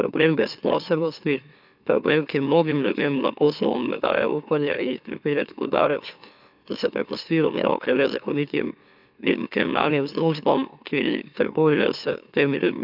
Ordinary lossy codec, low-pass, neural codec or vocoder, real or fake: none; 5.4 kHz; autoencoder, 44.1 kHz, a latent of 192 numbers a frame, MeloTTS; fake